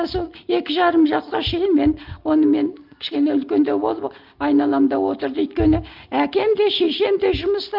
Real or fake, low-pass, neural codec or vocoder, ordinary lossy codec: real; 5.4 kHz; none; Opus, 24 kbps